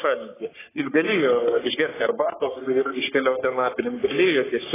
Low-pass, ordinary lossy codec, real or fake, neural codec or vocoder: 3.6 kHz; AAC, 16 kbps; fake; codec, 44.1 kHz, 3.4 kbps, Pupu-Codec